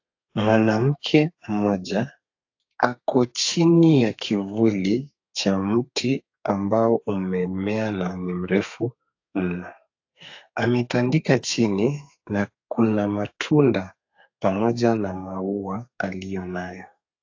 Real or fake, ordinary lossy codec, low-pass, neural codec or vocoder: fake; AAC, 48 kbps; 7.2 kHz; codec, 32 kHz, 1.9 kbps, SNAC